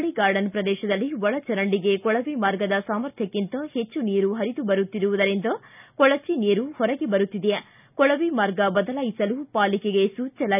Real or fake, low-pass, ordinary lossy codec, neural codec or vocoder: real; 3.6 kHz; none; none